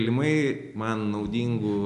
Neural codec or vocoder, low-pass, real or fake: none; 10.8 kHz; real